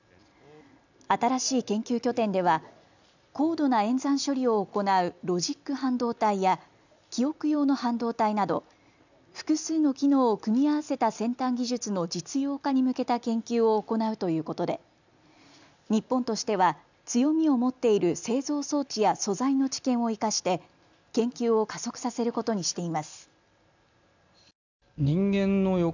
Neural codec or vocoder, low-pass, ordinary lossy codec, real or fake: none; 7.2 kHz; none; real